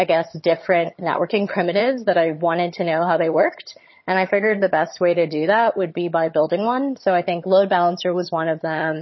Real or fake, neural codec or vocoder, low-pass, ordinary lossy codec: fake; vocoder, 22.05 kHz, 80 mel bands, HiFi-GAN; 7.2 kHz; MP3, 24 kbps